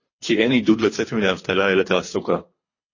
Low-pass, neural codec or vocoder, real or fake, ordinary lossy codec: 7.2 kHz; codec, 24 kHz, 3 kbps, HILCodec; fake; MP3, 32 kbps